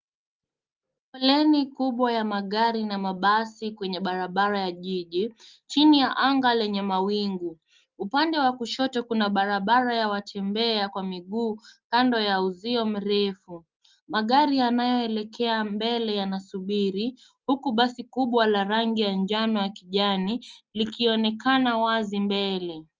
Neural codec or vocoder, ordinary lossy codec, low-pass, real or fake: none; Opus, 24 kbps; 7.2 kHz; real